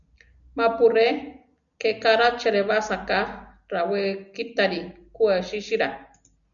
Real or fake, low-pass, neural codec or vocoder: real; 7.2 kHz; none